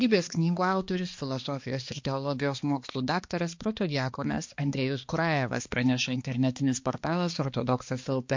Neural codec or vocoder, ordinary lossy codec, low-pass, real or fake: codec, 16 kHz, 2 kbps, X-Codec, HuBERT features, trained on balanced general audio; MP3, 48 kbps; 7.2 kHz; fake